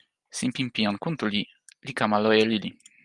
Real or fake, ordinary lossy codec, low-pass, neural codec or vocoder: real; Opus, 32 kbps; 10.8 kHz; none